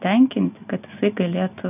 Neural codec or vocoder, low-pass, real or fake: none; 3.6 kHz; real